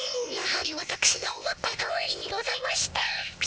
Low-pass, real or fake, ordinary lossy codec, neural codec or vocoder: none; fake; none; codec, 16 kHz, 0.8 kbps, ZipCodec